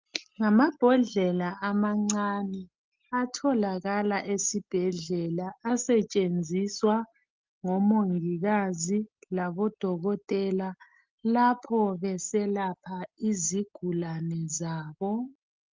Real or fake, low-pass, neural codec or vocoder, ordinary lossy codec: real; 7.2 kHz; none; Opus, 32 kbps